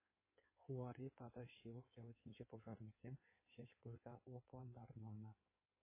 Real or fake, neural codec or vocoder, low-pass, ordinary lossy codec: fake; codec, 16 kHz in and 24 kHz out, 1.1 kbps, FireRedTTS-2 codec; 3.6 kHz; MP3, 16 kbps